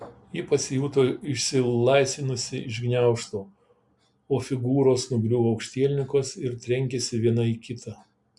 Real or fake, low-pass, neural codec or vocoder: real; 10.8 kHz; none